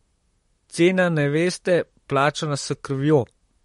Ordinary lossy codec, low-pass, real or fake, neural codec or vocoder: MP3, 48 kbps; 19.8 kHz; fake; vocoder, 44.1 kHz, 128 mel bands, Pupu-Vocoder